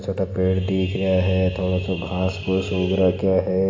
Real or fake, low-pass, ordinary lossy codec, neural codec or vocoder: real; 7.2 kHz; AAC, 32 kbps; none